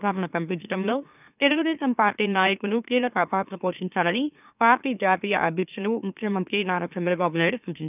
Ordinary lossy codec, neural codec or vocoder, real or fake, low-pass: none; autoencoder, 44.1 kHz, a latent of 192 numbers a frame, MeloTTS; fake; 3.6 kHz